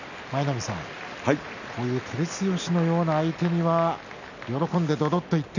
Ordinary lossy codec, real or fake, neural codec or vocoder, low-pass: none; real; none; 7.2 kHz